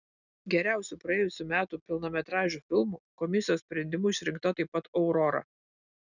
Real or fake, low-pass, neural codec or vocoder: real; 7.2 kHz; none